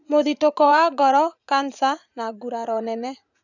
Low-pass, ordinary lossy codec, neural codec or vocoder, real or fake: 7.2 kHz; none; vocoder, 44.1 kHz, 80 mel bands, Vocos; fake